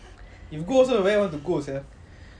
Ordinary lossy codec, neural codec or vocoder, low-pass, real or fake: none; none; 9.9 kHz; real